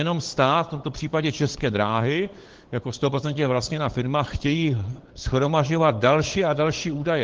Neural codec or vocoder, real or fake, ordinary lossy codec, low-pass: codec, 16 kHz, 8 kbps, FunCodec, trained on LibriTTS, 25 frames a second; fake; Opus, 16 kbps; 7.2 kHz